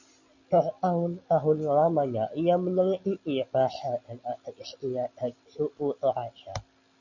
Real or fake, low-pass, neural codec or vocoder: real; 7.2 kHz; none